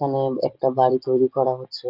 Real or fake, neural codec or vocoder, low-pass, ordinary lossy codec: real; none; 5.4 kHz; Opus, 32 kbps